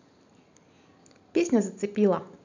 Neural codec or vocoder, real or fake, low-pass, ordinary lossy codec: none; real; 7.2 kHz; none